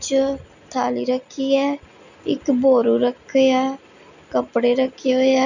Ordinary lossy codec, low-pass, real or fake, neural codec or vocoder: none; 7.2 kHz; real; none